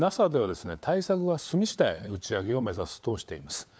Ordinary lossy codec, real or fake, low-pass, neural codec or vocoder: none; fake; none; codec, 16 kHz, 4 kbps, FunCodec, trained on LibriTTS, 50 frames a second